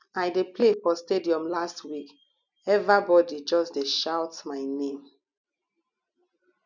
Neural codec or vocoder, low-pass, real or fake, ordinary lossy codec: none; 7.2 kHz; real; none